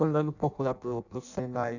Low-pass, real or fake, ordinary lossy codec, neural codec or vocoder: 7.2 kHz; fake; none; codec, 16 kHz in and 24 kHz out, 0.6 kbps, FireRedTTS-2 codec